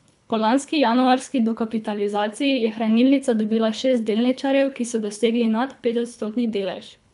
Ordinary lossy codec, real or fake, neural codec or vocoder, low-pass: none; fake; codec, 24 kHz, 3 kbps, HILCodec; 10.8 kHz